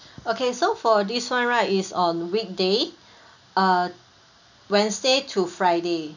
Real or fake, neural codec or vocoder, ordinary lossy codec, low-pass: real; none; none; 7.2 kHz